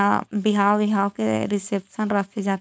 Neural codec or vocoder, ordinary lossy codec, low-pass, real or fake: codec, 16 kHz, 4.8 kbps, FACodec; none; none; fake